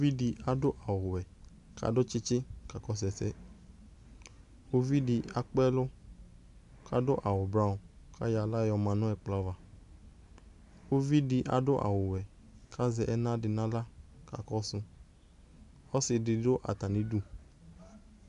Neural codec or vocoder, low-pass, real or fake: none; 10.8 kHz; real